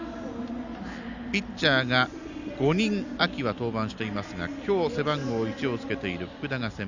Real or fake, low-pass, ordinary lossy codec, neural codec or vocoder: real; 7.2 kHz; none; none